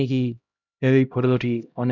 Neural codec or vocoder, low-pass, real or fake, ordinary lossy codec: codec, 16 kHz, 0.5 kbps, X-Codec, HuBERT features, trained on LibriSpeech; 7.2 kHz; fake; none